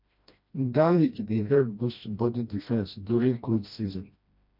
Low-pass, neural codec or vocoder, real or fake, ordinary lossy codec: 5.4 kHz; codec, 16 kHz, 1 kbps, FreqCodec, smaller model; fake; MP3, 48 kbps